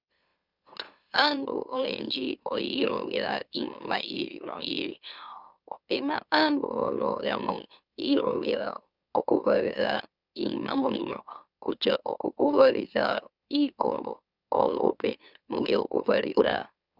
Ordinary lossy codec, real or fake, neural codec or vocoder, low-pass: Opus, 64 kbps; fake; autoencoder, 44.1 kHz, a latent of 192 numbers a frame, MeloTTS; 5.4 kHz